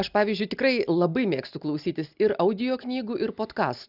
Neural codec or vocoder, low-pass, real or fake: none; 5.4 kHz; real